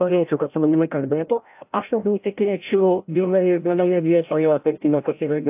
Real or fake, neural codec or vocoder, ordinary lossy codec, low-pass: fake; codec, 16 kHz, 0.5 kbps, FreqCodec, larger model; AAC, 32 kbps; 3.6 kHz